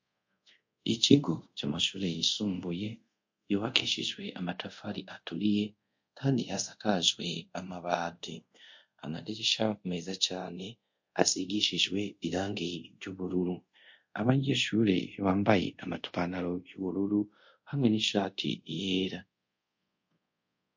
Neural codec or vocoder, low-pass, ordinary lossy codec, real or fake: codec, 24 kHz, 0.5 kbps, DualCodec; 7.2 kHz; MP3, 48 kbps; fake